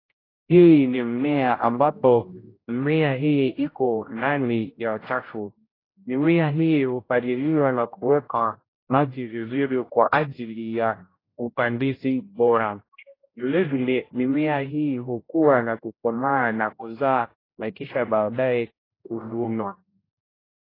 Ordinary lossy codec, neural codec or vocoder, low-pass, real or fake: AAC, 24 kbps; codec, 16 kHz, 0.5 kbps, X-Codec, HuBERT features, trained on general audio; 5.4 kHz; fake